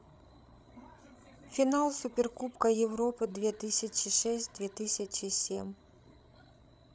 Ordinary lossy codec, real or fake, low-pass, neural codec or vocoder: none; fake; none; codec, 16 kHz, 16 kbps, FreqCodec, larger model